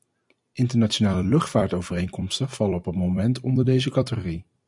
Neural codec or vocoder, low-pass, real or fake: vocoder, 44.1 kHz, 128 mel bands every 256 samples, BigVGAN v2; 10.8 kHz; fake